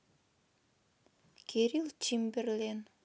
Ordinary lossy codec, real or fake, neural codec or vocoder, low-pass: none; real; none; none